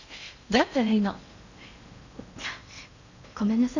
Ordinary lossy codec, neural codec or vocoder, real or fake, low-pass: none; codec, 16 kHz in and 24 kHz out, 0.8 kbps, FocalCodec, streaming, 65536 codes; fake; 7.2 kHz